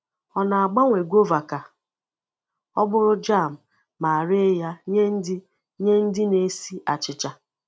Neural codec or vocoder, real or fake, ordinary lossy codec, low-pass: none; real; none; none